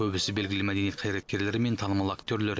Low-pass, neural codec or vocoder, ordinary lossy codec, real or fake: none; none; none; real